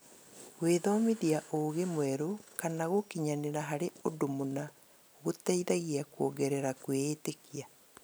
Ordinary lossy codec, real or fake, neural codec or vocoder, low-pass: none; real; none; none